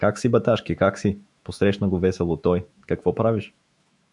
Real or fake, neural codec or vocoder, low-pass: fake; autoencoder, 48 kHz, 128 numbers a frame, DAC-VAE, trained on Japanese speech; 10.8 kHz